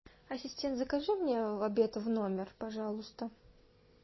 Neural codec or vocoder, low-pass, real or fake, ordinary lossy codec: none; 7.2 kHz; real; MP3, 24 kbps